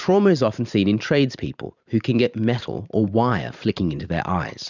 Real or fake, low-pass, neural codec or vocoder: fake; 7.2 kHz; vocoder, 44.1 kHz, 128 mel bands every 512 samples, BigVGAN v2